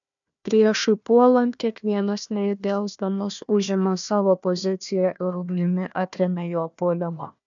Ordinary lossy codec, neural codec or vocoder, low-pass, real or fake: MP3, 96 kbps; codec, 16 kHz, 1 kbps, FunCodec, trained on Chinese and English, 50 frames a second; 7.2 kHz; fake